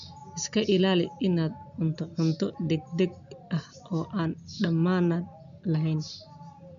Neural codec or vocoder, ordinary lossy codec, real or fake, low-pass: none; none; real; 7.2 kHz